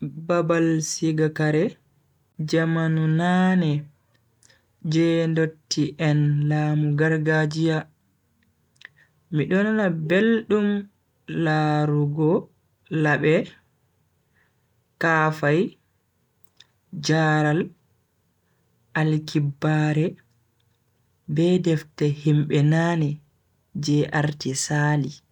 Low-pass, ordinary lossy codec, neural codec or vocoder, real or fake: 19.8 kHz; none; none; real